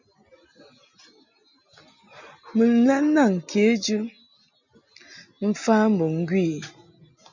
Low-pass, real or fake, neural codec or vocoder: 7.2 kHz; real; none